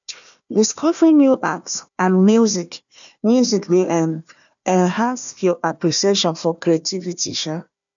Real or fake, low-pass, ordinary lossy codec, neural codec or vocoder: fake; 7.2 kHz; none; codec, 16 kHz, 1 kbps, FunCodec, trained on Chinese and English, 50 frames a second